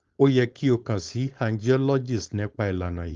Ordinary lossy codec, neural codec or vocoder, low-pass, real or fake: Opus, 32 kbps; codec, 16 kHz, 4.8 kbps, FACodec; 7.2 kHz; fake